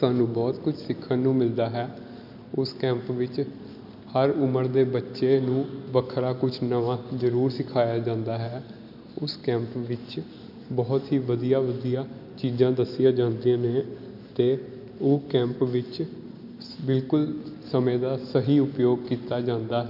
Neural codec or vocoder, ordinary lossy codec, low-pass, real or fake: none; none; 5.4 kHz; real